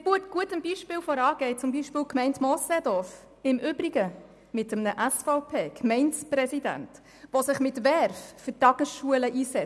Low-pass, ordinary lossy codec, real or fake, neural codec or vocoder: none; none; real; none